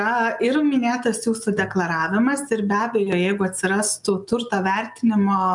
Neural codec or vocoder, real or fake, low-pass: none; real; 10.8 kHz